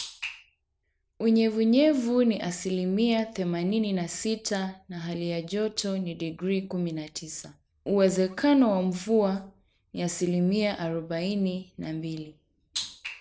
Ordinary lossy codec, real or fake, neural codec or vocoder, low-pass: none; real; none; none